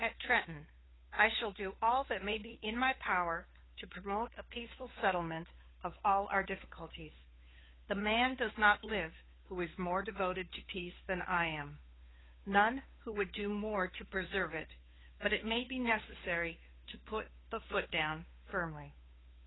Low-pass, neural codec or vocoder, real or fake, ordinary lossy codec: 7.2 kHz; codec, 16 kHz, 2 kbps, FunCodec, trained on Chinese and English, 25 frames a second; fake; AAC, 16 kbps